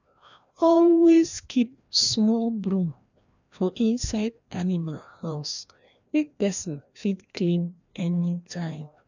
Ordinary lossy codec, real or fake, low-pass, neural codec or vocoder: none; fake; 7.2 kHz; codec, 16 kHz, 1 kbps, FreqCodec, larger model